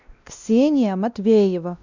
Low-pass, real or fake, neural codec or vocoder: 7.2 kHz; fake; codec, 16 kHz, 1 kbps, X-Codec, WavLM features, trained on Multilingual LibriSpeech